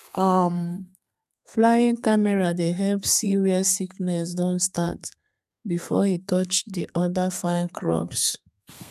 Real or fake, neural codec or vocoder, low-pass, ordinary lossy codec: fake; codec, 32 kHz, 1.9 kbps, SNAC; 14.4 kHz; none